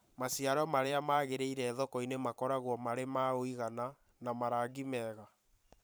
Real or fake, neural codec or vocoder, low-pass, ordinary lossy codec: fake; vocoder, 44.1 kHz, 128 mel bands every 512 samples, BigVGAN v2; none; none